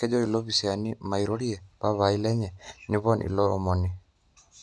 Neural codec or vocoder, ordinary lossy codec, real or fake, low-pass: none; none; real; none